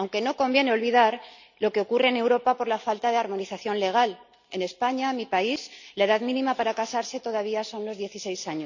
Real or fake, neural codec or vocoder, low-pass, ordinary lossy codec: real; none; 7.2 kHz; none